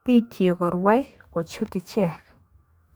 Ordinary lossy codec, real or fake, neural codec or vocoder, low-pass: none; fake; codec, 44.1 kHz, 2.6 kbps, DAC; none